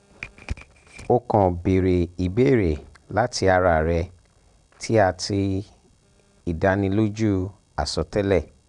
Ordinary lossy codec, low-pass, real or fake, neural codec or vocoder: none; 10.8 kHz; real; none